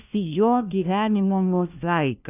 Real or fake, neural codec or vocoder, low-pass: fake; codec, 16 kHz, 1 kbps, FunCodec, trained on LibriTTS, 50 frames a second; 3.6 kHz